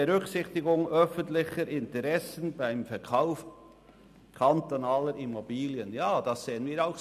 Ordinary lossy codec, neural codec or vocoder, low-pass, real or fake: none; none; 14.4 kHz; real